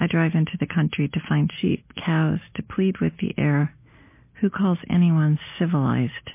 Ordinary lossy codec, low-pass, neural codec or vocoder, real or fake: MP3, 24 kbps; 3.6 kHz; none; real